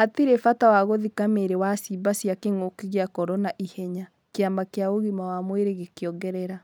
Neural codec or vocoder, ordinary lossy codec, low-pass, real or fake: none; none; none; real